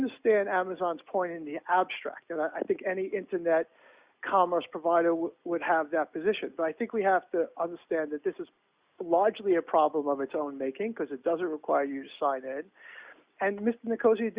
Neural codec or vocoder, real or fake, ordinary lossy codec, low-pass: none; real; Opus, 64 kbps; 3.6 kHz